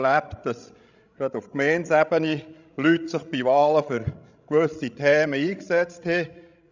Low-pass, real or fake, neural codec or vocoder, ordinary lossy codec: 7.2 kHz; fake; codec, 16 kHz, 16 kbps, FreqCodec, larger model; none